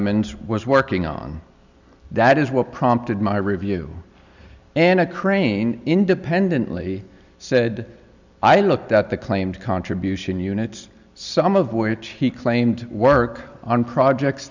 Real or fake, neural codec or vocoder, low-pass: real; none; 7.2 kHz